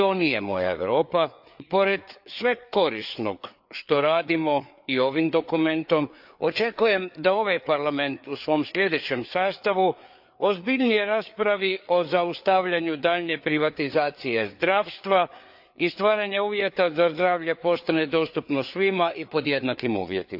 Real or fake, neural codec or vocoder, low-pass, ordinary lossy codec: fake; codec, 16 kHz, 4 kbps, FreqCodec, larger model; 5.4 kHz; AAC, 48 kbps